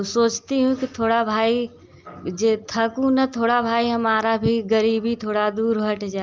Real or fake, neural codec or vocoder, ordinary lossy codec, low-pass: real; none; Opus, 24 kbps; 7.2 kHz